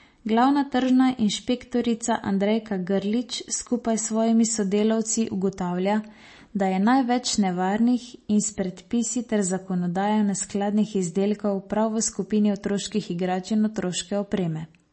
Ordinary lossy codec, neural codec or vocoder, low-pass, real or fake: MP3, 32 kbps; none; 9.9 kHz; real